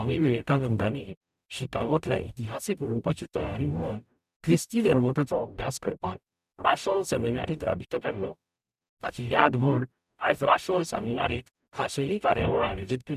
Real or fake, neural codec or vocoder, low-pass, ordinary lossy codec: fake; codec, 44.1 kHz, 0.9 kbps, DAC; 14.4 kHz; none